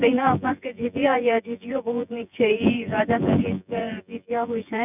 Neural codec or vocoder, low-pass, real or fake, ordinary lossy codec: vocoder, 24 kHz, 100 mel bands, Vocos; 3.6 kHz; fake; none